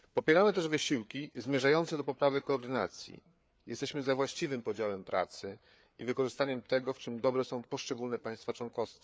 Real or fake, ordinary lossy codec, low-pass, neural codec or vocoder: fake; none; none; codec, 16 kHz, 4 kbps, FreqCodec, larger model